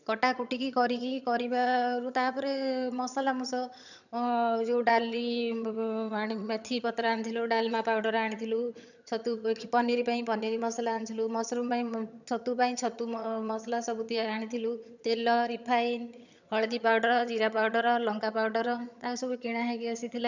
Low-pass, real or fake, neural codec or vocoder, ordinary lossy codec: 7.2 kHz; fake; vocoder, 22.05 kHz, 80 mel bands, HiFi-GAN; none